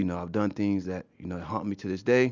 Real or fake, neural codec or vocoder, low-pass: real; none; 7.2 kHz